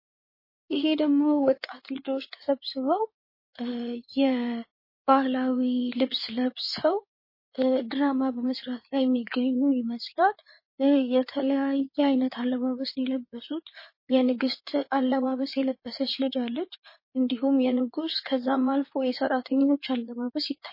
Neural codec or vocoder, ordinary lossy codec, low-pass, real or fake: codec, 16 kHz in and 24 kHz out, 2.2 kbps, FireRedTTS-2 codec; MP3, 24 kbps; 5.4 kHz; fake